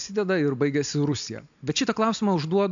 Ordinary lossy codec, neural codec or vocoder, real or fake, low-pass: MP3, 64 kbps; none; real; 7.2 kHz